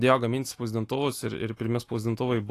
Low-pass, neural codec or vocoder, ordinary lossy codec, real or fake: 14.4 kHz; autoencoder, 48 kHz, 128 numbers a frame, DAC-VAE, trained on Japanese speech; AAC, 48 kbps; fake